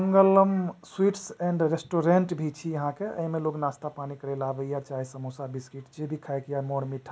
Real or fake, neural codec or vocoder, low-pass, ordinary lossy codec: real; none; none; none